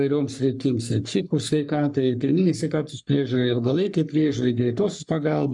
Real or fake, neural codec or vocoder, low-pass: fake; codec, 44.1 kHz, 3.4 kbps, Pupu-Codec; 10.8 kHz